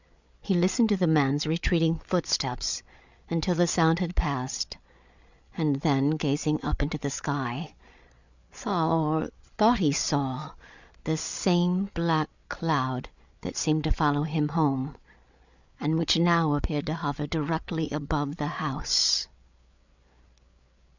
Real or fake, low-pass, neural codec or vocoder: fake; 7.2 kHz; codec, 16 kHz, 8 kbps, FreqCodec, larger model